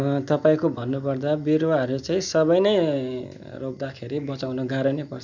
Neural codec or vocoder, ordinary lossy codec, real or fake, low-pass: none; none; real; 7.2 kHz